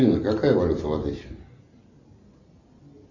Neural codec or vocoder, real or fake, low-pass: none; real; 7.2 kHz